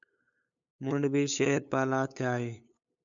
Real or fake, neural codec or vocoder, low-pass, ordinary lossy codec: fake; codec, 16 kHz, 8 kbps, FunCodec, trained on LibriTTS, 25 frames a second; 7.2 kHz; MP3, 96 kbps